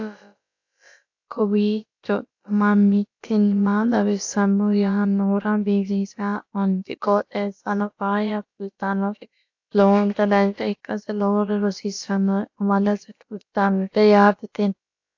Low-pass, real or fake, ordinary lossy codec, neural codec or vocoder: 7.2 kHz; fake; AAC, 48 kbps; codec, 16 kHz, about 1 kbps, DyCAST, with the encoder's durations